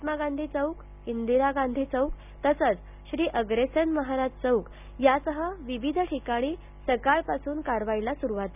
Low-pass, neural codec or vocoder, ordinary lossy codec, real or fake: 3.6 kHz; none; none; real